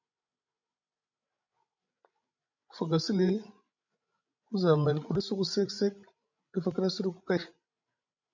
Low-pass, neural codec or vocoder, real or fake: 7.2 kHz; codec, 16 kHz, 16 kbps, FreqCodec, larger model; fake